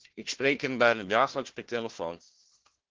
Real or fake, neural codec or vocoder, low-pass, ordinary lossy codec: fake; codec, 16 kHz, 1 kbps, FunCodec, trained on Chinese and English, 50 frames a second; 7.2 kHz; Opus, 16 kbps